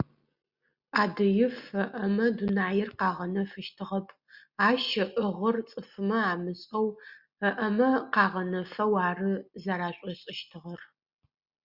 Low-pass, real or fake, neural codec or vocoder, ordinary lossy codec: 5.4 kHz; real; none; Opus, 64 kbps